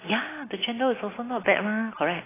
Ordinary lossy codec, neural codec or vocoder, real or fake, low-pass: AAC, 16 kbps; none; real; 3.6 kHz